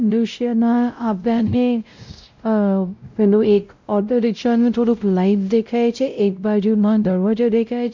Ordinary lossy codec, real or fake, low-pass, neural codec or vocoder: MP3, 64 kbps; fake; 7.2 kHz; codec, 16 kHz, 0.5 kbps, X-Codec, WavLM features, trained on Multilingual LibriSpeech